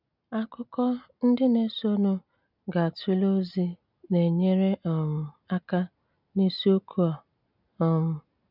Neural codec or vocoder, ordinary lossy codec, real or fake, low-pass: none; none; real; 5.4 kHz